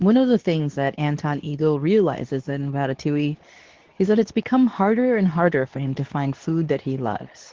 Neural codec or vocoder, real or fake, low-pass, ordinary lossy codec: codec, 24 kHz, 0.9 kbps, WavTokenizer, medium speech release version 2; fake; 7.2 kHz; Opus, 16 kbps